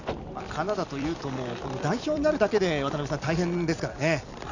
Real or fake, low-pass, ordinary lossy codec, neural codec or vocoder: fake; 7.2 kHz; none; vocoder, 44.1 kHz, 128 mel bands every 256 samples, BigVGAN v2